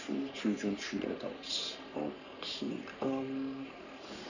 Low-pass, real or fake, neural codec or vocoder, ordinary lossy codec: 7.2 kHz; fake; codec, 44.1 kHz, 3.4 kbps, Pupu-Codec; none